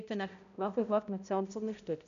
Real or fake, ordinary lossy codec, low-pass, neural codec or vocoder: fake; none; 7.2 kHz; codec, 16 kHz, 0.5 kbps, X-Codec, HuBERT features, trained on balanced general audio